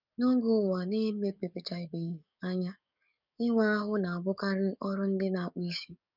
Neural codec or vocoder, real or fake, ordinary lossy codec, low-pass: codec, 44.1 kHz, 7.8 kbps, DAC; fake; none; 5.4 kHz